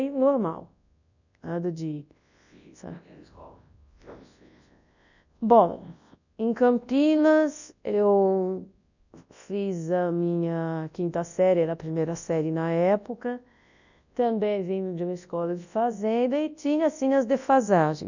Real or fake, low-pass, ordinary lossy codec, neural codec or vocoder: fake; 7.2 kHz; none; codec, 24 kHz, 0.9 kbps, WavTokenizer, large speech release